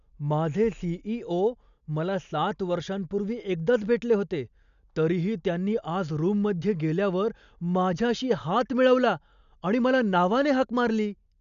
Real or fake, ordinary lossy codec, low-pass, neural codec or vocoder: real; none; 7.2 kHz; none